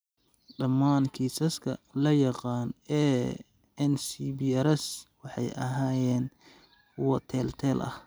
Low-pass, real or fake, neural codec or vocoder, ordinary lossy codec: none; real; none; none